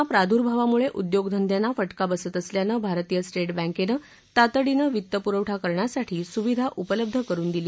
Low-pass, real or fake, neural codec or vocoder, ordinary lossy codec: none; real; none; none